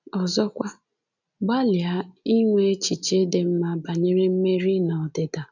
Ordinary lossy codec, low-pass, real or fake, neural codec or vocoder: none; 7.2 kHz; real; none